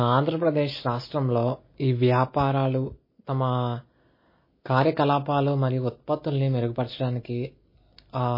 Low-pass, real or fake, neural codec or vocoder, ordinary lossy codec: 5.4 kHz; real; none; MP3, 24 kbps